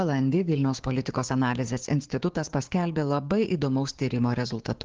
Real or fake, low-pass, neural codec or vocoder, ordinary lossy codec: fake; 7.2 kHz; codec, 16 kHz, 6 kbps, DAC; Opus, 16 kbps